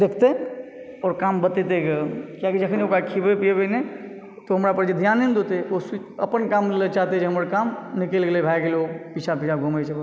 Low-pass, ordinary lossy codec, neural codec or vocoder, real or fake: none; none; none; real